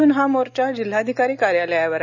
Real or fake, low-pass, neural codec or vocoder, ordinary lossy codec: real; 7.2 kHz; none; none